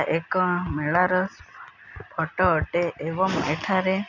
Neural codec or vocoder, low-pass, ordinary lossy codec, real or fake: none; 7.2 kHz; none; real